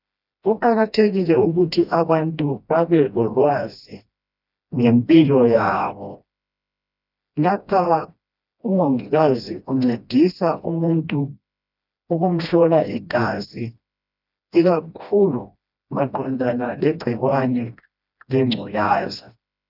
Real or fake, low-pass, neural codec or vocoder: fake; 5.4 kHz; codec, 16 kHz, 1 kbps, FreqCodec, smaller model